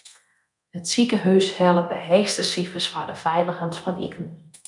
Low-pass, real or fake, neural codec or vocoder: 10.8 kHz; fake; codec, 24 kHz, 0.9 kbps, DualCodec